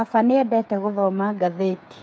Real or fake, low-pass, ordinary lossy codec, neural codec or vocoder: fake; none; none; codec, 16 kHz, 8 kbps, FreqCodec, smaller model